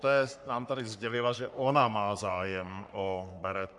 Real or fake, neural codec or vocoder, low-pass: fake; codec, 44.1 kHz, 3.4 kbps, Pupu-Codec; 10.8 kHz